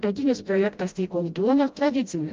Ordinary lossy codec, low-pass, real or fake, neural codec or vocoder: Opus, 32 kbps; 7.2 kHz; fake; codec, 16 kHz, 0.5 kbps, FreqCodec, smaller model